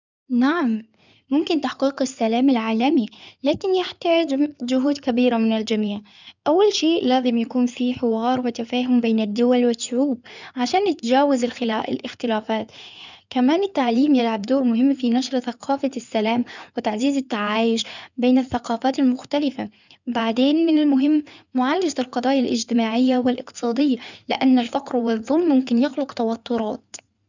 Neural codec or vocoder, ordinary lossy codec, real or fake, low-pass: codec, 16 kHz in and 24 kHz out, 2.2 kbps, FireRedTTS-2 codec; none; fake; 7.2 kHz